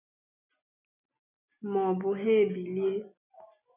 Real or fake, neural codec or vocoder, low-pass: real; none; 3.6 kHz